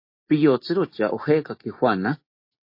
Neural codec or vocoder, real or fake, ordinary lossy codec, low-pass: none; real; MP3, 32 kbps; 5.4 kHz